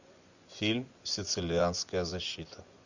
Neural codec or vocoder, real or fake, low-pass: vocoder, 24 kHz, 100 mel bands, Vocos; fake; 7.2 kHz